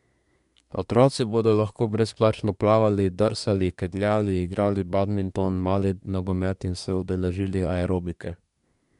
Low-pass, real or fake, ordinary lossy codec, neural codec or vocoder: 10.8 kHz; fake; MP3, 96 kbps; codec, 24 kHz, 1 kbps, SNAC